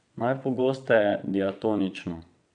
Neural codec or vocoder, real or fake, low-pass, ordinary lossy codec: vocoder, 22.05 kHz, 80 mel bands, WaveNeXt; fake; 9.9 kHz; none